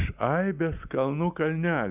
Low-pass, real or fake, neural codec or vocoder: 3.6 kHz; real; none